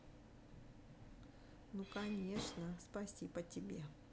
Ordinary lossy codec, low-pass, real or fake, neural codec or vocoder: none; none; real; none